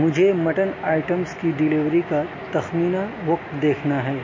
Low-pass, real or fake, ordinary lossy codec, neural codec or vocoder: 7.2 kHz; real; MP3, 32 kbps; none